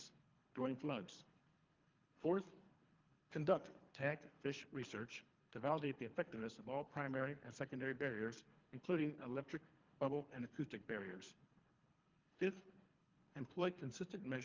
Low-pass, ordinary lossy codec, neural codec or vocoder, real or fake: 7.2 kHz; Opus, 24 kbps; codec, 24 kHz, 3 kbps, HILCodec; fake